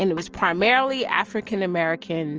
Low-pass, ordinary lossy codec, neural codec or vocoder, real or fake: 7.2 kHz; Opus, 24 kbps; none; real